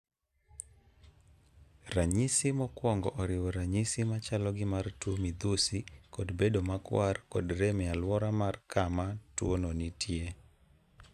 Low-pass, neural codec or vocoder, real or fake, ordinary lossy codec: 14.4 kHz; none; real; none